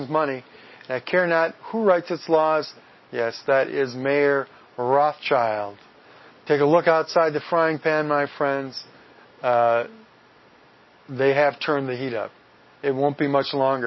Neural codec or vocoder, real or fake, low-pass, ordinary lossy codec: none; real; 7.2 kHz; MP3, 24 kbps